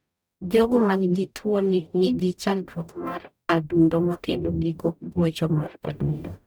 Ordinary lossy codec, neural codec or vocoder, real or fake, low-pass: none; codec, 44.1 kHz, 0.9 kbps, DAC; fake; none